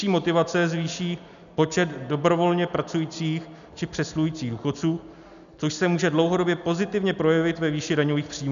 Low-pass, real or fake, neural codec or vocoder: 7.2 kHz; real; none